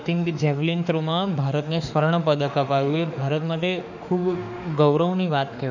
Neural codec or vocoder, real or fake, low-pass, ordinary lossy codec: autoencoder, 48 kHz, 32 numbers a frame, DAC-VAE, trained on Japanese speech; fake; 7.2 kHz; none